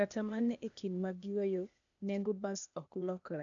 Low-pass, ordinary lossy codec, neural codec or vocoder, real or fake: 7.2 kHz; none; codec, 16 kHz, 0.8 kbps, ZipCodec; fake